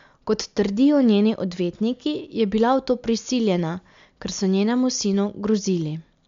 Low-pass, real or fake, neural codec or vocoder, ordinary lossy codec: 7.2 kHz; real; none; MP3, 64 kbps